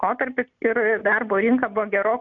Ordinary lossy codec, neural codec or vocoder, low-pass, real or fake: MP3, 64 kbps; none; 7.2 kHz; real